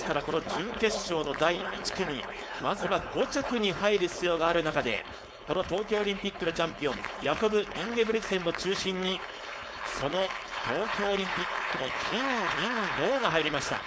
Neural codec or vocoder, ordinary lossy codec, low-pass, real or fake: codec, 16 kHz, 4.8 kbps, FACodec; none; none; fake